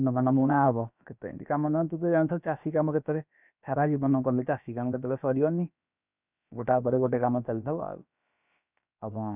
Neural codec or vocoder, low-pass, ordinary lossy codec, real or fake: codec, 16 kHz, about 1 kbps, DyCAST, with the encoder's durations; 3.6 kHz; none; fake